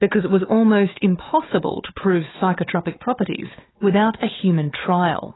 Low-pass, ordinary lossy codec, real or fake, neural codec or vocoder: 7.2 kHz; AAC, 16 kbps; fake; codec, 16 kHz, 4 kbps, FunCodec, trained on Chinese and English, 50 frames a second